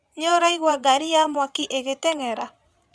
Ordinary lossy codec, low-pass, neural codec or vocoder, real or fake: none; none; vocoder, 22.05 kHz, 80 mel bands, Vocos; fake